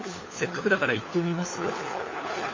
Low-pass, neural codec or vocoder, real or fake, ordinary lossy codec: 7.2 kHz; codec, 16 kHz, 4 kbps, FunCodec, trained on LibriTTS, 50 frames a second; fake; MP3, 32 kbps